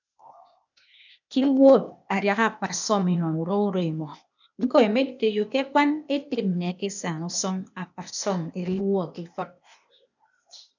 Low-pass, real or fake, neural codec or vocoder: 7.2 kHz; fake; codec, 16 kHz, 0.8 kbps, ZipCodec